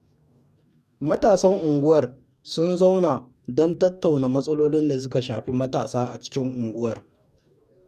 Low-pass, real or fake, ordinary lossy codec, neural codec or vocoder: 14.4 kHz; fake; none; codec, 44.1 kHz, 2.6 kbps, DAC